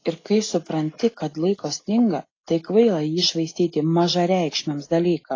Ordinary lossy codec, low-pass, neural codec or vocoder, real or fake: AAC, 32 kbps; 7.2 kHz; none; real